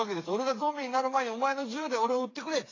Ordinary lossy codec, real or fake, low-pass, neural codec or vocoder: AAC, 32 kbps; fake; 7.2 kHz; codec, 16 kHz, 4 kbps, FreqCodec, smaller model